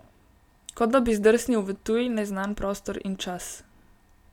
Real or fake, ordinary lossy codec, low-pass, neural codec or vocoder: real; none; 19.8 kHz; none